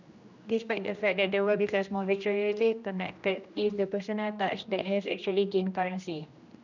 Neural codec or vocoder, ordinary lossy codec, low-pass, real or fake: codec, 16 kHz, 1 kbps, X-Codec, HuBERT features, trained on general audio; none; 7.2 kHz; fake